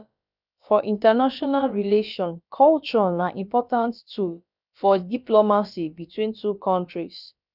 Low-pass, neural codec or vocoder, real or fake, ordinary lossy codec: 5.4 kHz; codec, 16 kHz, about 1 kbps, DyCAST, with the encoder's durations; fake; none